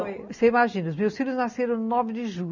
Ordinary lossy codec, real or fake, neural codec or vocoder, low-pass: none; real; none; 7.2 kHz